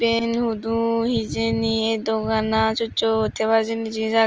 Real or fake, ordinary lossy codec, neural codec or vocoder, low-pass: real; Opus, 24 kbps; none; 7.2 kHz